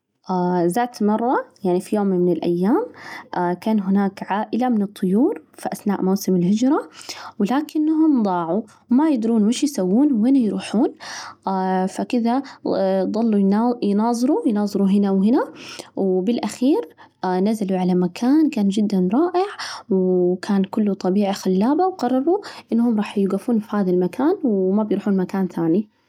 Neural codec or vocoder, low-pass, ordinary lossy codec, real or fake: none; 19.8 kHz; none; real